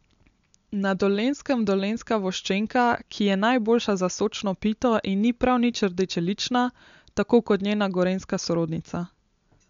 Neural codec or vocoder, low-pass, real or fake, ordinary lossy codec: none; 7.2 kHz; real; MP3, 64 kbps